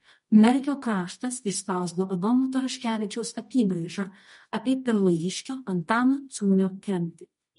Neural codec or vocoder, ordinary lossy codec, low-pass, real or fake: codec, 24 kHz, 0.9 kbps, WavTokenizer, medium music audio release; MP3, 48 kbps; 10.8 kHz; fake